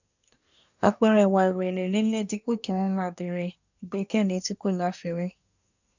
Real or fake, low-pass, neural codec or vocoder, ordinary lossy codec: fake; 7.2 kHz; codec, 24 kHz, 1 kbps, SNAC; none